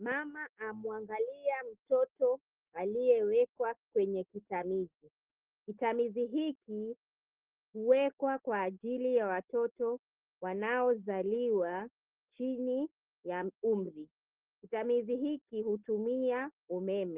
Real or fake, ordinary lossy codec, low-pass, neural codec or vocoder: real; Opus, 16 kbps; 3.6 kHz; none